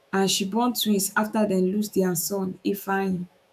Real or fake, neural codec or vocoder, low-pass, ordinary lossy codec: fake; autoencoder, 48 kHz, 128 numbers a frame, DAC-VAE, trained on Japanese speech; 14.4 kHz; none